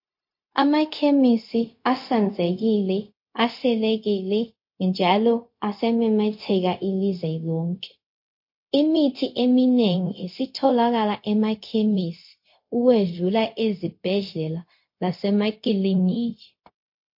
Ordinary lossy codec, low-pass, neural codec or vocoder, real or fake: MP3, 32 kbps; 5.4 kHz; codec, 16 kHz, 0.4 kbps, LongCat-Audio-Codec; fake